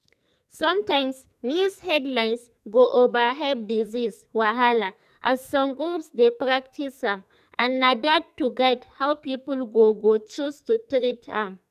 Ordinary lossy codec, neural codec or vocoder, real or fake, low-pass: none; codec, 44.1 kHz, 2.6 kbps, SNAC; fake; 14.4 kHz